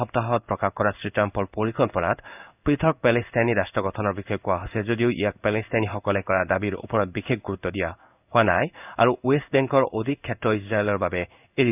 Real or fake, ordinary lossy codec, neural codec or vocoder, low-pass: fake; none; codec, 16 kHz in and 24 kHz out, 1 kbps, XY-Tokenizer; 3.6 kHz